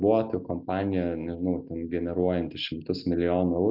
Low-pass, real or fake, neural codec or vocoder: 5.4 kHz; real; none